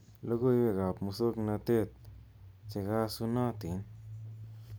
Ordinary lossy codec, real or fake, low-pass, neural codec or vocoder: none; real; none; none